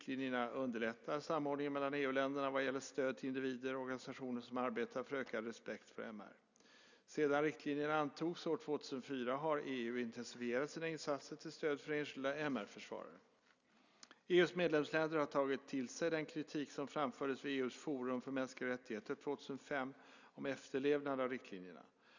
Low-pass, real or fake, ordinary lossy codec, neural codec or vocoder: 7.2 kHz; real; none; none